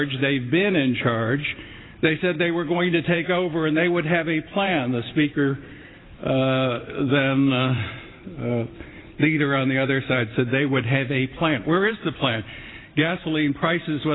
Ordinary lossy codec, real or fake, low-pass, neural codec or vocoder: AAC, 16 kbps; fake; 7.2 kHz; vocoder, 44.1 kHz, 128 mel bands every 512 samples, BigVGAN v2